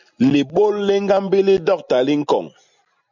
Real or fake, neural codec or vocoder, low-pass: real; none; 7.2 kHz